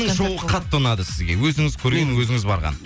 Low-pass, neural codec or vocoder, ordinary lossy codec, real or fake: none; none; none; real